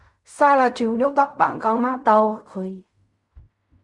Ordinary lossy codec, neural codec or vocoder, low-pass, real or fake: Opus, 64 kbps; codec, 16 kHz in and 24 kHz out, 0.4 kbps, LongCat-Audio-Codec, fine tuned four codebook decoder; 10.8 kHz; fake